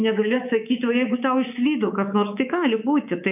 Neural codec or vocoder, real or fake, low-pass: codec, 24 kHz, 3.1 kbps, DualCodec; fake; 3.6 kHz